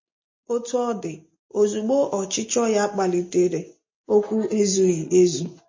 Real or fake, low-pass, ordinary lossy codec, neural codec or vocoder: real; 7.2 kHz; MP3, 32 kbps; none